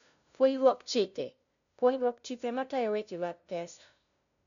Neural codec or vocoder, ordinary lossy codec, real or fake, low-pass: codec, 16 kHz, 0.5 kbps, FunCodec, trained on LibriTTS, 25 frames a second; none; fake; 7.2 kHz